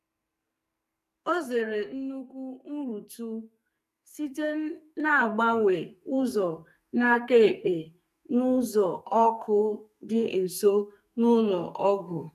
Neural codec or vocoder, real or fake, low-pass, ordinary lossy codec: codec, 44.1 kHz, 2.6 kbps, SNAC; fake; 14.4 kHz; none